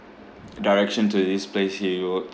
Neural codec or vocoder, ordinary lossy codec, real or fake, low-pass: none; none; real; none